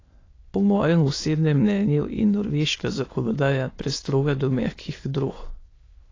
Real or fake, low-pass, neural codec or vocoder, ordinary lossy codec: fake; 7.2 kHz; autoencoder, 22.05 kHz, a latent of 192 numbers a frame, VITS, trained on many speakers; AAC, 32 kbps